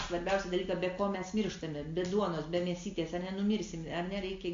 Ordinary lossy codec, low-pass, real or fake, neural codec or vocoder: MP3, 48 kbps; 7.2 kHz; real; none